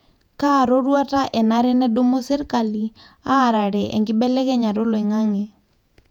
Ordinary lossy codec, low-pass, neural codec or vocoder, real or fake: none; 19.8 kHz; vocoder, 48 kHz, 128 mel bands, Vocos; fake